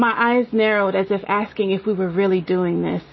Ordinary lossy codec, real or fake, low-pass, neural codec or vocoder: MP3, 24 kbps; real; 7.2 kHz; none